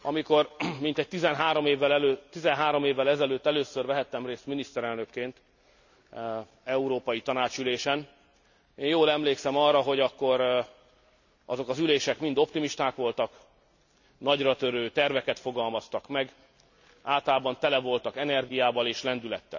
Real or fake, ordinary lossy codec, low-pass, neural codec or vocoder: real; none; 7.2 kHz; none